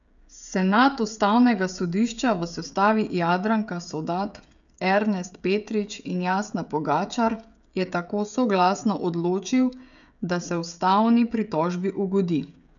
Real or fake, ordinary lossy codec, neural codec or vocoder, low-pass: fake; none; codec, 16 kHz, 16 kbps, FreqCodec, smaller model; 7.2 kHz